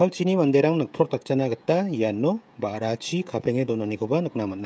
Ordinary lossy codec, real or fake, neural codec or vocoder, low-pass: none; fake; codec, 16 kHz, 16 kbps, FreqCodec, smaller model; none